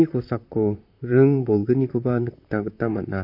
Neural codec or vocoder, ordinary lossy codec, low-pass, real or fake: vocoder, 44.1 kHz, 128 mel bands, Pupu-Vocoder; MP3, 32 kbps; 5.4 kHz; fake